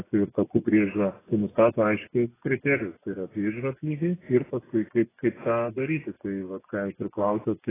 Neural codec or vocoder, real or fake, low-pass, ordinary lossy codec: vocoder, 24 kHz, 100 mel bands, Vocos; fake; 3.6 kHz; AAC, 16 kbps